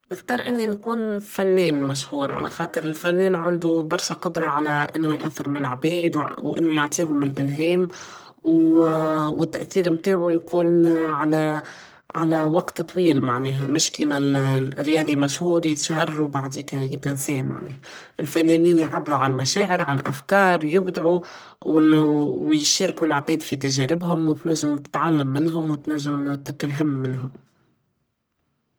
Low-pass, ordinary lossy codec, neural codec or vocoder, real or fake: none; none; codec, 44.1 kHz, 1.7 kbps, Pupu-Codec; fake